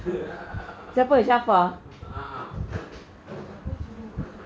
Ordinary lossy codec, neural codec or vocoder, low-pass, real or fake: none; none; none; real